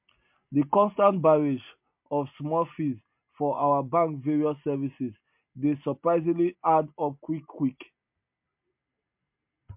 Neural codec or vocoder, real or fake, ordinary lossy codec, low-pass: none; real; MP3, 32 kbps; 3.6 kHz